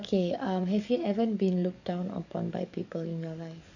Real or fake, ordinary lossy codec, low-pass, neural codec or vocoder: fake; none; 7.2 kHz; codec, 44.1 kHz, 7.8 kbps, DAC